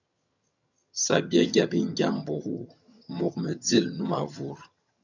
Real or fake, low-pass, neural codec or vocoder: fake; 7.2 kHz; vocoder, 22.05 kHz, 80 mel bands, HiFi-GAN